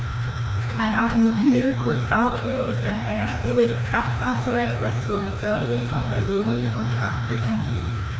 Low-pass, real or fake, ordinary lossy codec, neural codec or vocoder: none; fake; none; codec, 16 kHz, 1 kbps, FreqCodec, larger model